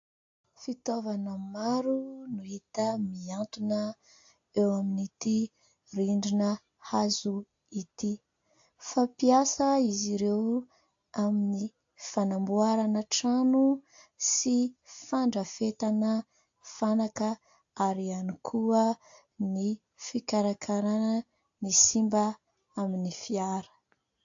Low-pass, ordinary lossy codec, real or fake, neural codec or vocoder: 7.2 kHz; AAC, 48 kbps; real; none